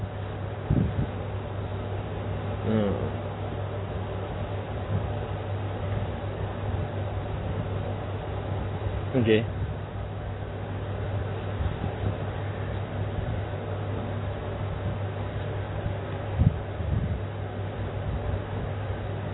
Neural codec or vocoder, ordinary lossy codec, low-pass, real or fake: none; AAC, 16 kbps; 7.2 kHz; real